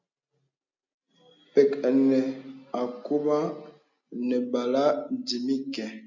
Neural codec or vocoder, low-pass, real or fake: none; 7.2 kHz; real